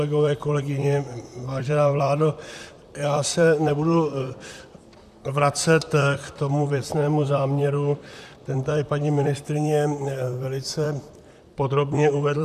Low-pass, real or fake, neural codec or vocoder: 14.4 kHz; fake; vocoder, 44.1 kHz, 128 mel bands, Pupu-Vocoder